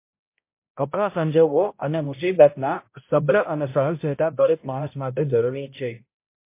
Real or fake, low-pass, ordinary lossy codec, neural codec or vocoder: fake; 3.6 kHz; MP3, 24 kbps; codec, 16 kHz, 0.5 kbps, X-Codec, HuBERT features, trained on balanced general audio